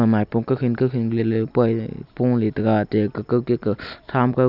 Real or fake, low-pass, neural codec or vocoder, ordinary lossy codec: real; 5.4 kHz; none; none